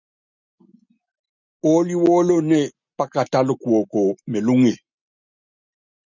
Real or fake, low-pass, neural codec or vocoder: real; 7.2 kHz; none